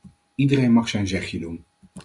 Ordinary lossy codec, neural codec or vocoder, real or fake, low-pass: Opus, 64 kbps; none; real; 10.8 kHz